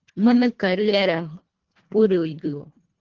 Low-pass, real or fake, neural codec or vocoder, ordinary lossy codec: 7.2 kHz; fake; codec, 24 kHz, 1.5 kbps, HILCodec; Opus, 16 kbps